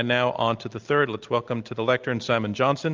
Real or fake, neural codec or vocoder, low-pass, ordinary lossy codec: real; none; 7.2 kHz; Opus, 24 kbps